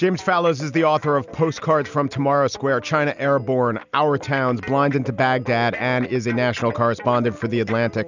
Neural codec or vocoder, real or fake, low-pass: none; real; 7.2 kHz